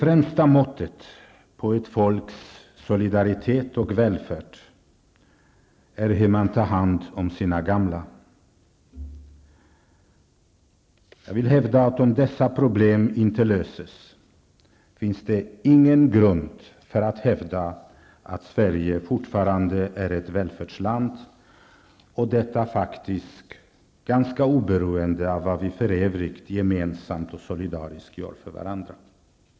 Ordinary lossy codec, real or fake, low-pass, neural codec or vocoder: none; real; none; none